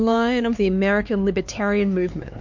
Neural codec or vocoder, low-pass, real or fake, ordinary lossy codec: codec, 16 kHz, 4 kbps, X-Codec, HuBERT features, trained on LibriSpeech; 7.2 kHz; fake; MP3, 48 kbps